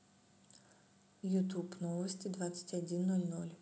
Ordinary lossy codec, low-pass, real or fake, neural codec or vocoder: none; none; real; none